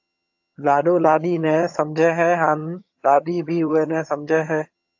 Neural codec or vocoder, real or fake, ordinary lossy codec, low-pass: vocoder, 22.05 kHz, 80 mel bands, HiFi-GAN; fake; AAC, 48 kbps; 7.2 kHz